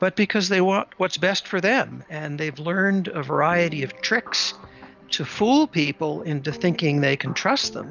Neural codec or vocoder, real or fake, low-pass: none; real; 7.2 kHz